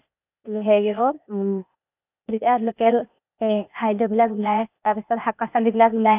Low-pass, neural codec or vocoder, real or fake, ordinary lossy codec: 3.6 kHz; codec, 16 kHz, 0.8 kbps, ZipCodec; fake; none